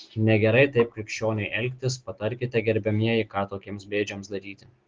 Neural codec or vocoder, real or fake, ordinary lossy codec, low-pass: none; real; Opus, 16 kbps; 7.2 kHz